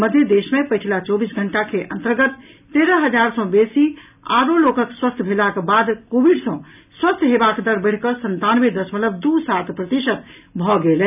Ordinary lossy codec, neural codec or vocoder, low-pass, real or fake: none; none; 3.6 kHz; real